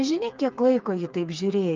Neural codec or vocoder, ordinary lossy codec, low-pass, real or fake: codec, 16 kHz, 4 kbps, FreqCodec, smaller model; Opus, 24 kbps; 7.2 kHz; fake